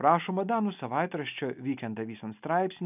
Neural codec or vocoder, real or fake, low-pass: none; real; 3.6 kHz